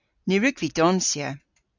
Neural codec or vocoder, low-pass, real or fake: none; 7.2 kHz; real